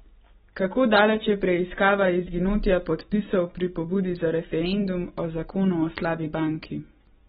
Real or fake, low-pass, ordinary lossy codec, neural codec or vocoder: real; 7.2 kHz; AAC, 16 kbps; none